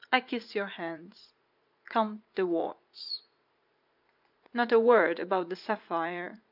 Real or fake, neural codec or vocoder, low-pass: fake; vocoder, 44.1 kHz, 80 mel bands, Vocos; 5.4 kHz